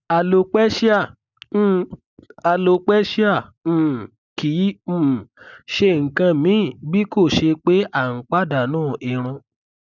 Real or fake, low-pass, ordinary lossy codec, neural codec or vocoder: real; 7.2 kHz; none; none